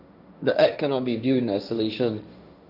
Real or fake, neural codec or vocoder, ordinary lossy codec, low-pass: fake; codec, 16 kHz, 1.1 kbps, Voila-Tokenizer; none; 5.4 kHz